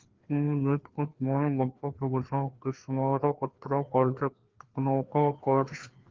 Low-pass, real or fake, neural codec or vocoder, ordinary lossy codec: 7.2 kHz; fake; codec, 16 kHz, 2 kbps, FreqCodec, larger model; Opus, 16 kbps